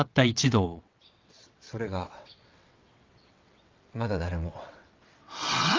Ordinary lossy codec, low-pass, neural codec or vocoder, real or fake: Opus, 24 kbps; 7.2 kHz; vocoder, 22.05 kHz, 80 mel bands, WaveNeXt; fake